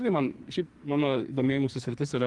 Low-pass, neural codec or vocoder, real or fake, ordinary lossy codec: 10.8 kHz; codec, 44.1 kHz, 2.6 kbps, SNAC; fake; Opus, 24 kbps